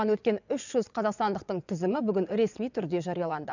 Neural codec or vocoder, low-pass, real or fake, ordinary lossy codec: vocoder, 22.05 kHz, 80 mel bands, WaveNeXt; 7.2 kHz; fake; none